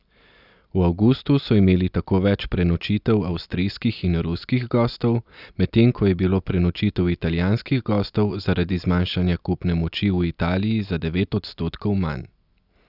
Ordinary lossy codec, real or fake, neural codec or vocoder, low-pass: none; real; none; 5.4 kHz